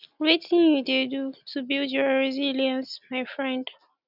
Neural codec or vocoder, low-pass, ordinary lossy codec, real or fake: none; 5.4 kHz; none; real